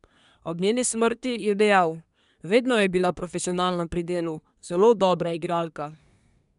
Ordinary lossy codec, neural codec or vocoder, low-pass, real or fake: none; codec, 24 kHz, 1 kbps, SNAC; 10.8 kHz; fake